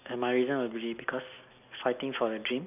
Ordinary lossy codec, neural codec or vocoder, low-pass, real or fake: none; none; 3.6 kHz; real